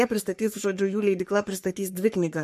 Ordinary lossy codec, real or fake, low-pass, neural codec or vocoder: MP3, 64 kbps; fake; 14.4 kHz; codec, 44.1 kHz, 3.4 kbps, Pupu-Codec